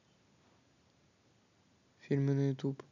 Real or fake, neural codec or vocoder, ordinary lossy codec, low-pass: real; none; none; 7.2 kHz